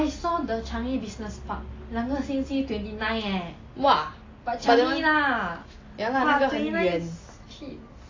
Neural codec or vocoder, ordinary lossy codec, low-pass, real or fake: none; AAC, 32 kbps; 7.2 kHz; real